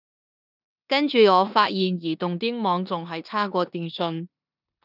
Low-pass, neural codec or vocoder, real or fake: 5.4 kHz; codec, 16 kHz in and 24 kHz out, 0.9 kbps, LongCat-Audio-Codec, four codebook decoder; fake